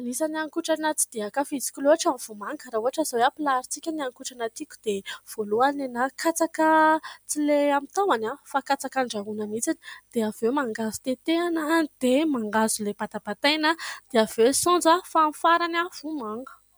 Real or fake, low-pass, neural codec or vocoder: real; 19.8 kHz; none